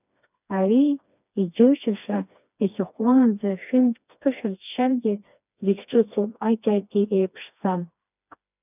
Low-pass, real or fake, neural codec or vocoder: 3.6 kHz; fake; codec, 16 kHz, 2 kbps, FreqCodec, smaller model